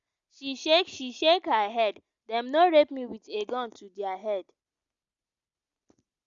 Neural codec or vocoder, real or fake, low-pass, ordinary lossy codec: none; real; 7.2 kHz; Opus, 64 kbps